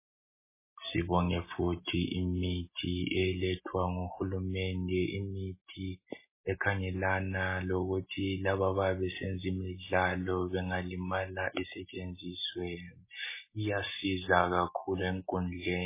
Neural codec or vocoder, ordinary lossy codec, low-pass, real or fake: none; MP3, 16 kbps; 3.6 kHz; real